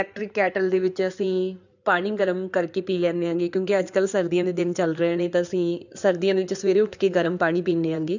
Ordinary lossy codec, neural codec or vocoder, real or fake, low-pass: none; codec, 16 kHz in and 24 kHz out, 2.2 kbps, FireRedTTS-2 codec; fake; 7.2 kHz